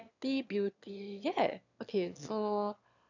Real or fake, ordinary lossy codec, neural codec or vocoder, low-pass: fake; none; autoencoder, 22.05 kHz, a latent of 192 numbers a frame, VITS, trained on one speaker; 7.2 kHz